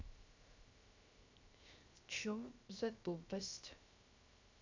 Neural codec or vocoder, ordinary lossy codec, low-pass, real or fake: codec, 16 kHz, 0.7 kbps, FocalCodec; MP3, 64 kbps; 7.2 kHz; fake